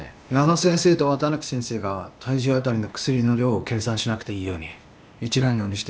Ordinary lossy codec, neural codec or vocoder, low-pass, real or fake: none; codec, 16 kHz, 0.8 kbps, ZipCodec; none; fake